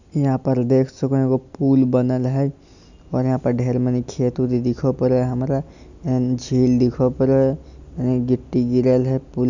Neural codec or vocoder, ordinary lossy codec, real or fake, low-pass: none; none; real; 7.2 kHz